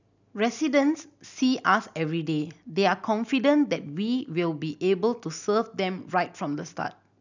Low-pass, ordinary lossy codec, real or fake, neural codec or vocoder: 7.2 kHz; none; real; none